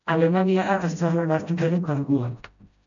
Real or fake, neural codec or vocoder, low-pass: fake; codec, 16 kHz, 0.5 kbps, FreqCodec, smaller model; 7.2 kHz